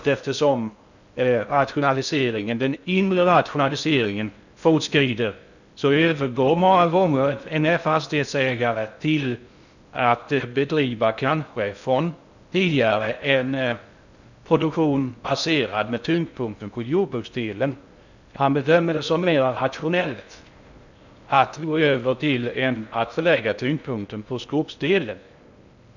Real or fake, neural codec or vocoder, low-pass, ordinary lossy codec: fake; codec, 16 kHz in and 24 kHz out, 0.6 kbps, FocalCodec, streaming, 2048 codes; 7.2 kHz; none